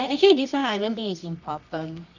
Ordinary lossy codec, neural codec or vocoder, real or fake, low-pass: none; codec, 24 kHz, 0.9 kbps, WavTokenizer, medium music audio release; fake; 7.2 kHz